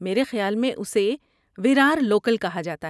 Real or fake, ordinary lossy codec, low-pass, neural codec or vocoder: real; none; none; none